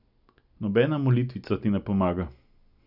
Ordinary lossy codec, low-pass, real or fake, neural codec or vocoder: none; 5.4 kHz; real; none